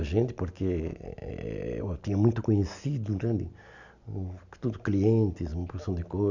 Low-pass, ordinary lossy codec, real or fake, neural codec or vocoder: 7.2 kHz; none; real; none